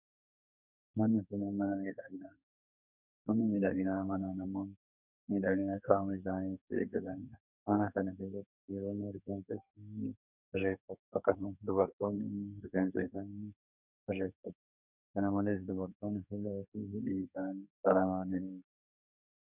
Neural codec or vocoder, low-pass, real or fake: codec, 32 kHz, 1.9 kbps, SNAC; 3.6 kHz; fake